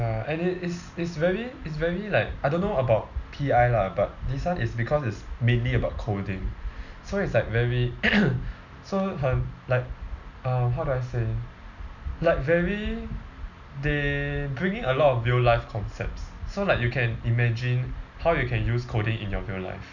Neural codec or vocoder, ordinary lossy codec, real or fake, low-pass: none; none; real; 7.2 kHz